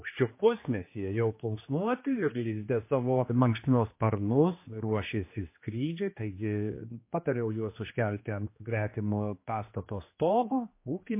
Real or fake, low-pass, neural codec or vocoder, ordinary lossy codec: fake; 3.6 kHz; codec, 16 kHz, 2 kbps, X-Codec, HuBERT features, trained on general audio; MP3, 24 kbps